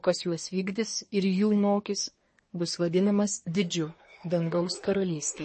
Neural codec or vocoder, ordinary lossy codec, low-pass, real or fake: codec, 24 kHz, 1 kbps, SNAC; MP3, 32 kbps; 10.8 kHz; fake